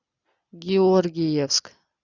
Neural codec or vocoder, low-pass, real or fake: none; 7.2 kHz; real